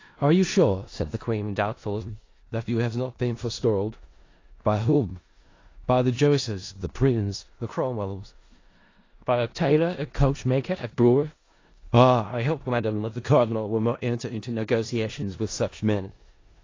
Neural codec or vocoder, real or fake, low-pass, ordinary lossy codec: codec, 16 kHz in and 24 kHz out, 0.4 kbps, LongCat-Audio-Codec, four codebook decoder; fake; 7.2 kHz; AAC, 32 kbps